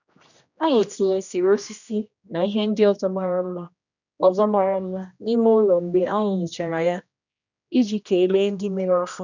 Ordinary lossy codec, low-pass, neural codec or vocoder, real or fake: none; 7.2 kHz; codec, 16 kHz, 1 kbps, X-Codec, HuBERT features, trained on general audio; fake